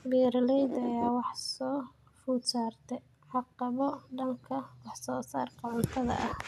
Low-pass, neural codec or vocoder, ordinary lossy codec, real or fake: 14.4 kHz; none; none; real